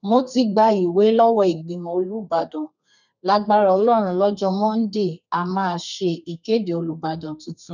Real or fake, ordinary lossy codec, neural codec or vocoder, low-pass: fake; none; codec, 32 kHz, 1.9 kbps, SNAC; 7.2 kHz